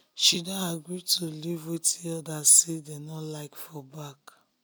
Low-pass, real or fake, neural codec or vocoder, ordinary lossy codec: none; real; none; none